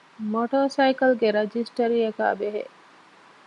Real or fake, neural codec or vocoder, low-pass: real; none; 10.8 kHz